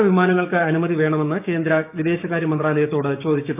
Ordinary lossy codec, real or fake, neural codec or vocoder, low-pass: none; fake; codec, 16 kHz, 6 kbps, DAC; 3.6 kHz